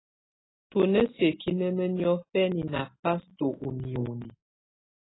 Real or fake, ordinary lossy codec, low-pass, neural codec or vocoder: real; AAC, 16 kbps; 7.2 kHz; none